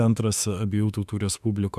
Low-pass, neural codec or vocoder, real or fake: 14.4 kHz; autoencoder, 48 kHz, 32 numbers a frame, DAC-VAE, trained on Japanese speech; fake